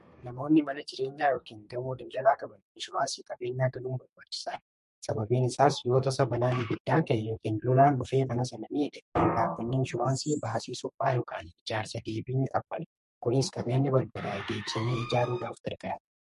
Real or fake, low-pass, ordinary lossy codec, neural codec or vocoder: fake; 14.4 kHz; MP3, 48 kbps; codec, 32 kHz, 1.9 kbps, SNAC